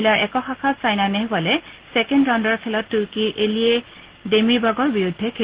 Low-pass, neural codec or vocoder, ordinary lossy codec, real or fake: 3.6 kHz; none; Opus, 16 kbps; real